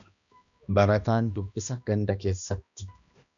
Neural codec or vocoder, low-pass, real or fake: codec, 16 kHz, 1 kbps, X-Codec, HuBERT features, trained on balanced general audio; 7.2 kHz; fake